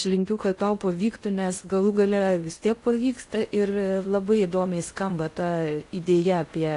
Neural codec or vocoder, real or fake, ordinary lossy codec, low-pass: codec, 16 kHz in and 24 kHz out, 0.6 kbps, FocalCodec, streaming, 2048 codes; fake; AAC, 48 kbps; 10.8 kHz